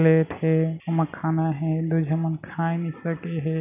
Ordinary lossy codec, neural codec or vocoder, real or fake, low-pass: none; none; real; 3.6 kHz